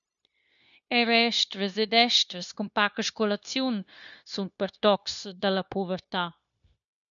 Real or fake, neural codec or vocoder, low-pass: fake; codec, 16 kHz, 0.9 kbps, LongCat-Audio-Codec; 7.2 kHz